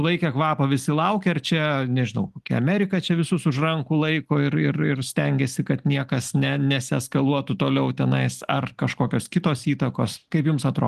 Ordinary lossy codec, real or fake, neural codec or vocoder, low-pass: Opus, 24 kbps; real; none; 14.4 kHz